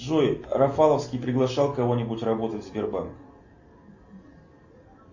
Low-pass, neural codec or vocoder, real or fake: 7.2 kHz; none; real